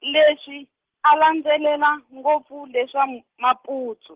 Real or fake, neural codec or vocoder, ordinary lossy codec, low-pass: real; none; Opus, 16 kbps; 3.6 kHz